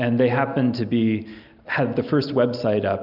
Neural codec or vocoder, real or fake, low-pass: none; real; 5.4 kHz